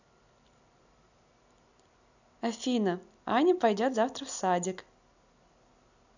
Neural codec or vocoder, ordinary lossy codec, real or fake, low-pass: none; none; real; 7.2 kHz